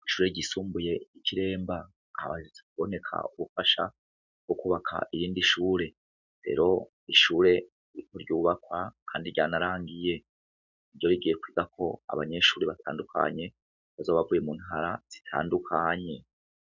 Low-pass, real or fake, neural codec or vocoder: 7.2 kHz; real; none